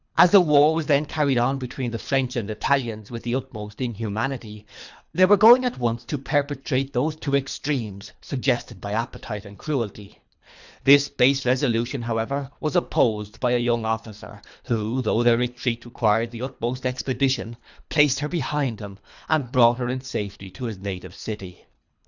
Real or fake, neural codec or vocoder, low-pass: fake; codec, 24 kHz, 3 kbps, HILCodec; 7.2 kHz